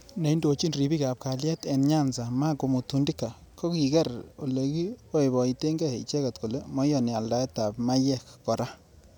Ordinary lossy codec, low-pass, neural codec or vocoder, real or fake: none; none; none; real